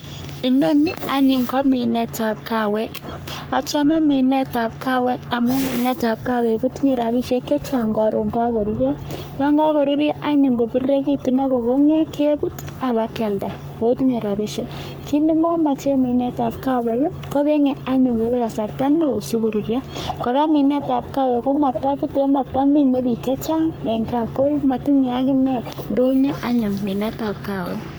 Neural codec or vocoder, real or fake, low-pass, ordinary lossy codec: codec, 44.1 kHz, 3.4 kbps, Pupu-Codec; fake; none; none